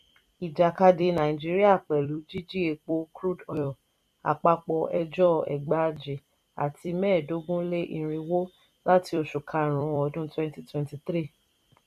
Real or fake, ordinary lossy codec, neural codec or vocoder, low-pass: fake; none; vocoder, 44.1 kHz, 128 mel bands every 256 samples, BigVGAN v2; 14.4 kHz